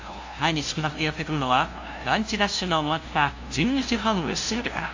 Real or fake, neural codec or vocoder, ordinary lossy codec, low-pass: fake; codec, 16 kHz, 0.5 kbps, FunCodec, trained on LibriTTS, 25 frames a second; AAC, 48 kbps; 7.2 kHz